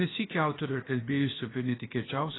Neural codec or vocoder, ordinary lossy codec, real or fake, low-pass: codec, 16 kHz, about 1 kbps, DyCAST, with the encoder's durations; AAC, 16 kbps; fake; 7.2 kHz